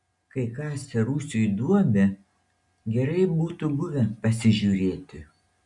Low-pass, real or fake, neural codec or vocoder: 10.8 kHz; real; none